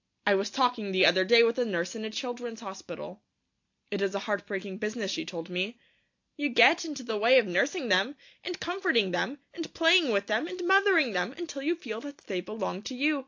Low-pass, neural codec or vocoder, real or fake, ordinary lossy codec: 7.2 kHz; none; real; AAC, 48 kbps